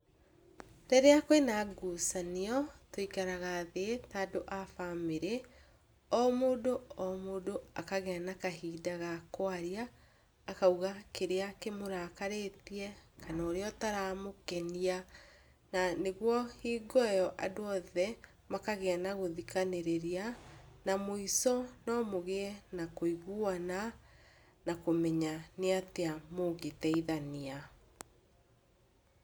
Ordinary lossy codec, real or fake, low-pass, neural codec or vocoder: none; real; none; none